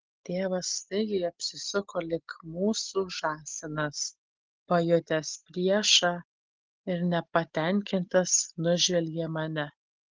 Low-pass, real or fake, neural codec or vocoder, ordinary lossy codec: 7.2 kHz; real; none; Opus, 16 kbps